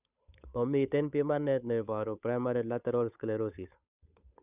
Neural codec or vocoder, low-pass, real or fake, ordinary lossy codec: codec, 16 kHz, 8 kbps, FunCodec, trained on Chinese and English, 25 frames a second; 3.6 kHz; fake; none